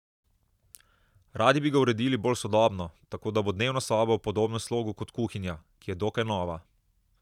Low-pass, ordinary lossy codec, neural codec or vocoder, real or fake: 19.8 kHz; none; none; real